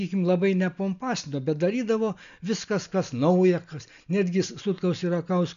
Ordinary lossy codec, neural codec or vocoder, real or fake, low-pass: MP3, 96 kbps; none; real; 7.2 kHz